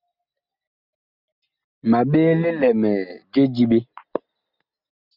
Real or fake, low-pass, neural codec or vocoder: real; 5.4 kHz; none